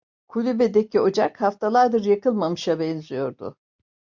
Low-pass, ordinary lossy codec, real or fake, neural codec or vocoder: 7.2 kHz; MP3, 64 kbps; real; none